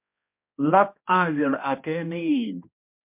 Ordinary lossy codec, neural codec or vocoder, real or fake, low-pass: MP3, 32 kbps; codec, 16 kHz, 1 kbps, X-Codec, HuBERT features, trained on balanced general audio; fake; 3.6 kHz